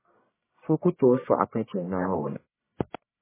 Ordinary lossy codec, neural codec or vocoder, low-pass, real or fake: MP3, 16 kbps; codec, 44.1 kHz, 1.7 kbps, Pupu-Codec; 3.6 kHz; fake